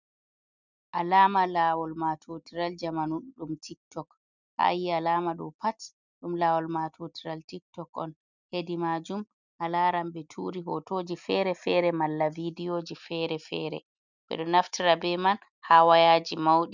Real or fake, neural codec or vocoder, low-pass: real; none; 7.2 kHz